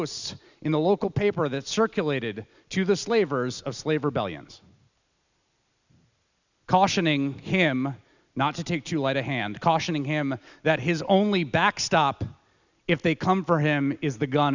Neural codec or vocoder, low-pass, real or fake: none; 7.2 kHz; real